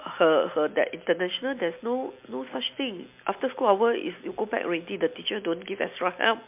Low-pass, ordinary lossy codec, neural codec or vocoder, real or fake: 3.6 kHz; MP3, 32 kbps; none; real